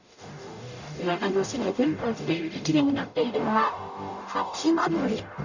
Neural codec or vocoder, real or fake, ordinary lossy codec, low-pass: codec, 44.1 kHz, 0.9 kbps, DAC; fake; none; 7.2 kHz